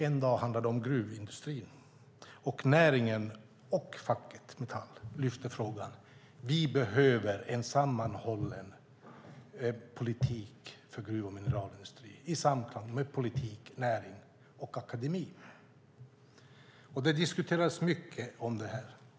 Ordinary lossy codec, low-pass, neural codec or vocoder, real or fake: none; none; none; real